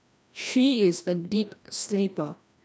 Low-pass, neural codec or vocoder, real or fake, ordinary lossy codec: none; codec, 16 kHz, 1 kbps, FreqCodec, larger model; fake; none